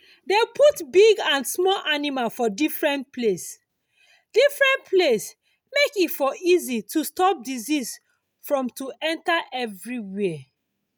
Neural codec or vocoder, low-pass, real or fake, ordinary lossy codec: none; none; real; none